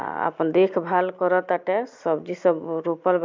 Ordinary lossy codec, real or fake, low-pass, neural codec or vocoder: MP3, 64 kbps; real; 7.2 kHz; none